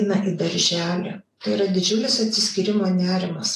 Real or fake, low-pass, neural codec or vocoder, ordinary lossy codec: real; 14.4 kHz; none; AAC, 48 kbps